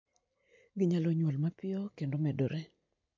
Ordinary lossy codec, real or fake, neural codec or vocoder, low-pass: MP3, 48 kbps; real; none; 7.2 kHz